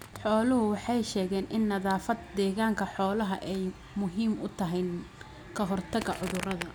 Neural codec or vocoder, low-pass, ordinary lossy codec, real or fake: none; none; none; real